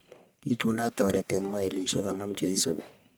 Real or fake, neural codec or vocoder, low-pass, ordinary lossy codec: fake; codec, 44.1 kHz, 1.7 kbps, Pupu-Codec; none; none